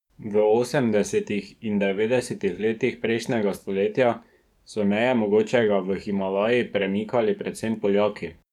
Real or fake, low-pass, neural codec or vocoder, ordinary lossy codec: fake; 19.8 kHz; codec, 44.1 kHz, 7.8 kbps, DAC; none